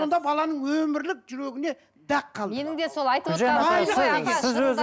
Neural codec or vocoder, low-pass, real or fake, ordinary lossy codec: none; none; real; none